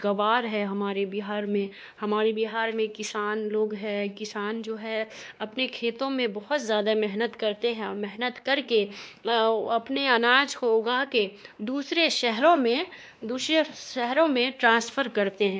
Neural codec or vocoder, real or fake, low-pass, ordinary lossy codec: codec, 16 kHz, 2 kbps, X-Codec, WavLM features, trained on Multilingual LibriSpeech; fake; none; none